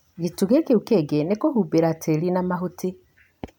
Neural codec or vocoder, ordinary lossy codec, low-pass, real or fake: none; none; 19.8 kHz; real